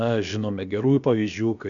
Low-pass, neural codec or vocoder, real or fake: 7.2 kHz; codec, 16 kHz, about 1 kbps, DyCAST, with the encoder's durations; fake